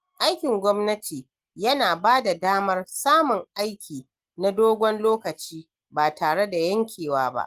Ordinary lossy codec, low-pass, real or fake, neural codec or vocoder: Opus, 24 kbps; 14.4 kHz; real; none